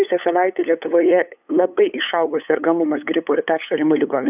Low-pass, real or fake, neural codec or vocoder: 3.6 kHz; fake; codec, 16 kHz, 8 kbps, FunCodec, trained on LibriTTS, 25 frames a second